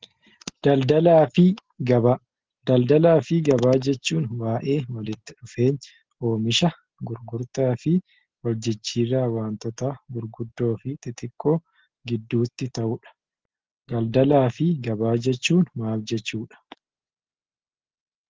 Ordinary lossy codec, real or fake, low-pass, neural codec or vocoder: Opus, 16 kbps; real; 7.2 kHz; none